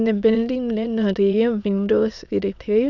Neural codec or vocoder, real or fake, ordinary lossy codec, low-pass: autoencoder, 22.05 kHz, a latent of 192 numbers a frame, VITS, trained on many speakers; fake; none; 7.2 kHz